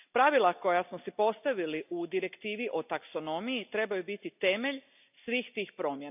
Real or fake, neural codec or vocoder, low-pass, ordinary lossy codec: real; none; 3.6 kHz; none